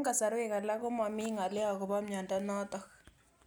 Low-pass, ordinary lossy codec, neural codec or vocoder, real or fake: none; none; none; real